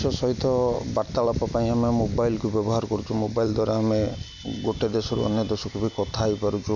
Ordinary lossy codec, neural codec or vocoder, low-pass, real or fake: none; none; 7.2 kHz; real